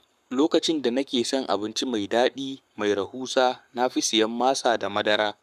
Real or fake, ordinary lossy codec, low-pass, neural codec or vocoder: fake; none; 14.4 kHz; codec, 44.1 kHz, 7.8 kbps, Pupu-Codec